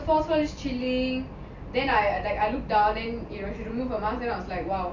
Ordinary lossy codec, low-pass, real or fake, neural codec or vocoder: none; 7.2 kHz; real; none